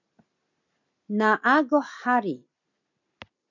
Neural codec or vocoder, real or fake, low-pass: none; real; 7.2 kHz